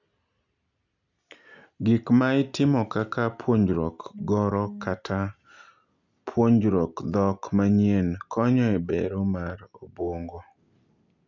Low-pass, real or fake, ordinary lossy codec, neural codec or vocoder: 7.2 kHz; real; none; none